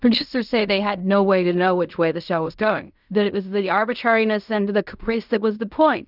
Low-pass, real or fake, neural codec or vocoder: 5.4 kHz; fake; codec, 16 kHz in and 24 kHz out, 0.4 kbps, LongCat-Audio-Codec, fine tuned four codebook decoder